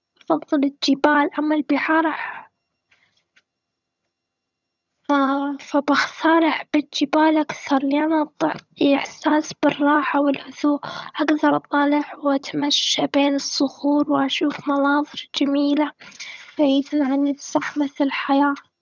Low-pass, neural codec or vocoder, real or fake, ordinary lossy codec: 7.2 kHz; vocoder, 22.05 kHz, 80 mel bands, HiFi-GAN; fake; none